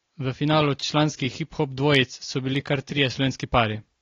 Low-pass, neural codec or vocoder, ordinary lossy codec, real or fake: 7.2 kHz; none; AAC, 32 kbps; real